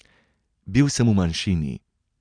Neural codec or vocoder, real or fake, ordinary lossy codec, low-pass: none; real; none; 9.9 kHz